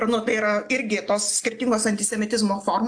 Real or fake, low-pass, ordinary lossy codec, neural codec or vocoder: real; 9.9 kHz; AAC, 64 kbps; none